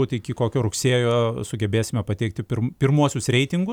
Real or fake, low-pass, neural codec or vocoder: real; 19.8 kHz; none